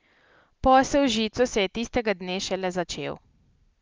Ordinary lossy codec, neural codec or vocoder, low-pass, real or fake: Opus, 24 kbps; none; 7.2 kHz; real